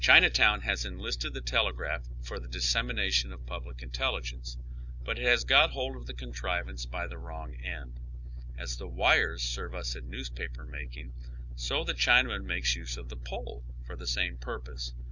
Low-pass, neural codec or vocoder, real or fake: 7.2 kHz; none; real